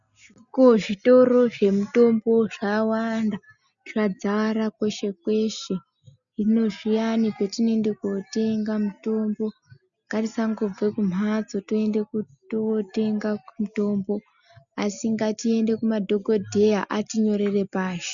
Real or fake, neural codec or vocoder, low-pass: real; none; 7.2 kHz